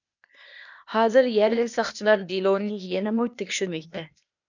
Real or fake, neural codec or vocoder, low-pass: fake; codec, 16 kHz, 0.8 kbps, ZipCodec; 7.2 kHz